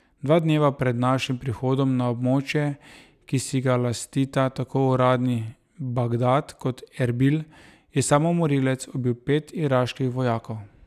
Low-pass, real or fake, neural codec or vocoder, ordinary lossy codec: 14.4 kHz; real; none; none